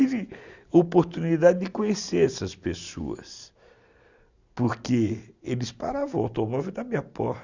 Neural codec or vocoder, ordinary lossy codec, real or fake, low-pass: none; Opus, 64 kbps; real; 7.2 kHz